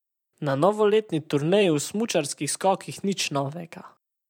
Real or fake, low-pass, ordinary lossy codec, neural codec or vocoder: fake; 19.8 kHz; none; vocoder, 44.1 kHz, 128 mel bands every 512 samples, BigVGAN v2